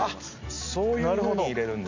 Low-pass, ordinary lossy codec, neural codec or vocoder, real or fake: 7.2 kHz; AAC, 48 kbps; none; real